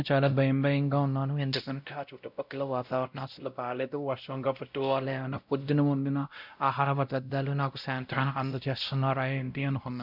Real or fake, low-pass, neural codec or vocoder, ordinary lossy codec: fake; 5.4 kHz; codec, 16 kHz, 0.5 kbps, X-Codec, WavLM features, trained on Multilingual LibriSpeech; none